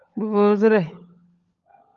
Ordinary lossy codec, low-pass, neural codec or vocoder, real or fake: Opus, 32 kbps; 7.2 kHz; codec, 16 kHz, 16 kbps, FunCodec, trained on LibriTTS, 50 frames a second; fake